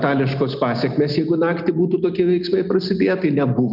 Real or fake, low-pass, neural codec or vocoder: real; 5.4 kHz; none